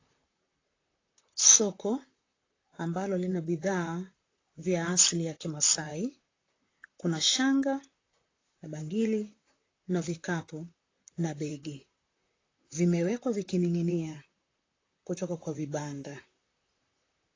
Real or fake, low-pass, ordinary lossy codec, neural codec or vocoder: fake; 7.2 kHz; AAC, 32 kbps; vocoder, 44.1 kHz, 128 mel bands, Pupu-Vocoder